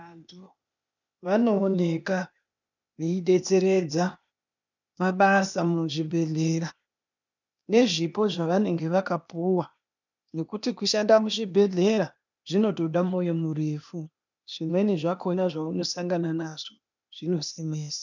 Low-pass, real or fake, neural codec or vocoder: 7.2 kHz; fake; codec, 16 kHz, 0.8 kbps, ZipCodec